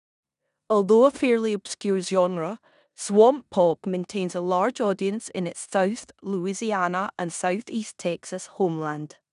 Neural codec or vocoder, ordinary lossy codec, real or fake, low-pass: codec, 16 kHz in and 24 kHz out, 0.9 kbps, LongCat-Audio-Codec, four codebook decoder; none; fake; 10.8 kHz